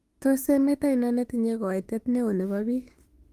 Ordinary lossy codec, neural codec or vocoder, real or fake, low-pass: Opus, 24 kbps; autoencoder, 48 kHz, 32 numbers a frame, DAC-VAE, trained on Japanese speech; fake; 14.4 kHz